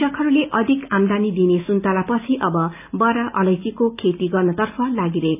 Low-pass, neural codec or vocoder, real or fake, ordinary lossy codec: 3.6 kHz; none; real; none